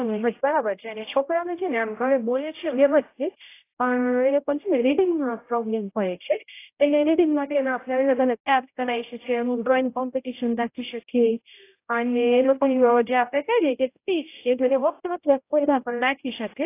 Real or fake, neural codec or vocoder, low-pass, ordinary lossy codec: fake; codec, 16 kHz, 0.5 kbps, X-Codec, HuBERT features, trained on general audio; 3.6 kHz; AAC, 24 kbps